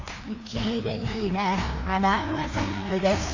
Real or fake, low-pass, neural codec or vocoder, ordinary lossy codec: fake; 7.2 kHz; codec, 16 kHz, 2 kbps, FreqCodec, larger model; AAC, 48 kbps